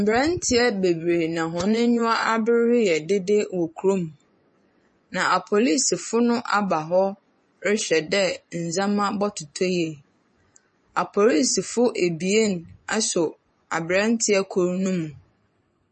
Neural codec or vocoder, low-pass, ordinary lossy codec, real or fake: vocoder, 24 kHz, 100 mel bands, Vocos; 10.8 kHz; MP3, 32 kbps; fake